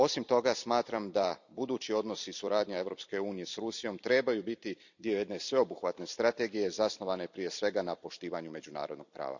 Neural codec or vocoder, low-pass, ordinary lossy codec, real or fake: vocoder, 44.1 kHz, 128 mel bands every 256 samples, BigVGAN v2; 7.2 kHz; none; fake